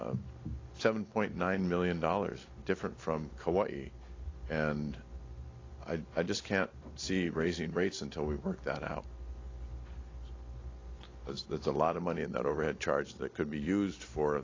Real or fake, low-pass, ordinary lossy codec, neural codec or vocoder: real; 7.2 kHz; AAC, 32 kbps; none